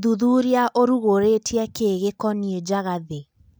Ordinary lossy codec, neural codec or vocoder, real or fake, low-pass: none; none; real; none